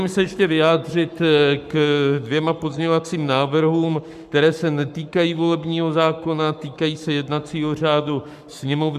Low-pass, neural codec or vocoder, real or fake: 14.4 kHz; codec, 44.1 kHz, 7.8 kbps, Pupu-Codec; fake